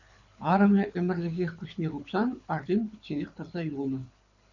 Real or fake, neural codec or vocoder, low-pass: fake; codec, 24 kHz, 6 kbps, HILCodec; 7.2 kHz